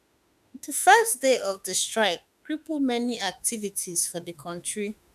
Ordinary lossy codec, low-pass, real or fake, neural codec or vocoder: none; 14.4 kHz; fake; autoencoder, 48 kHz, 32 numbers a frame, DAC-VAE, trained on Japanese speech